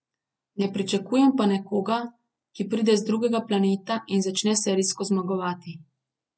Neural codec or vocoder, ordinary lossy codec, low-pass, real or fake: none; none; none; real